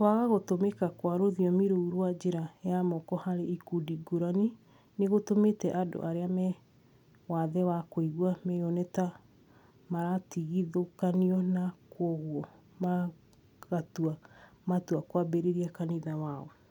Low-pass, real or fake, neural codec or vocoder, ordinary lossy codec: 19.8 kHz; real; none; none